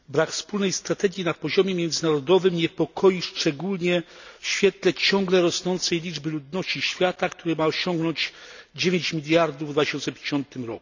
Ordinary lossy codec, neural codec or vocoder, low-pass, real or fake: none; none; 7.2 kHz; real